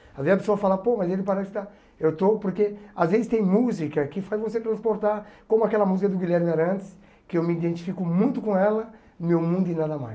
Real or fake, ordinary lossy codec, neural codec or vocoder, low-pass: real; none; none; none